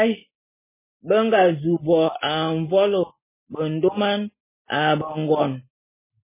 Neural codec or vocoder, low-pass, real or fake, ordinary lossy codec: none; 3.6 kHz; real; MP3, 16 kbps